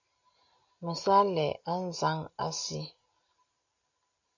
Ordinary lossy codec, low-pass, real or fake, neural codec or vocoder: AAC, 48 kbps; 7.2 kHz; real; none